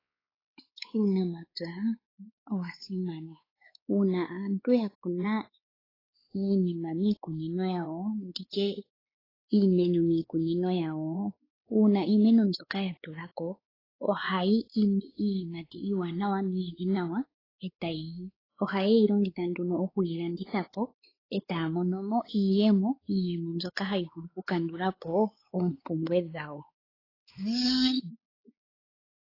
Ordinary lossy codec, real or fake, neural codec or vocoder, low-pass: AAC, 24 kbps; fake; codec, 16 kHz, 4 kbps, X-Codec, WavLM features, trained on Multilingual LibriSpeech; 5.4 kHz